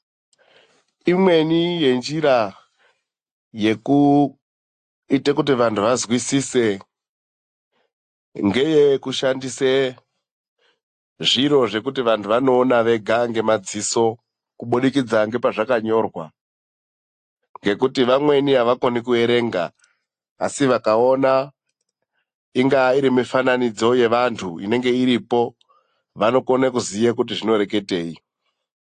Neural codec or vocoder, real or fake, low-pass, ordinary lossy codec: none; real; 9.9 kHz; AAC, 48 kbps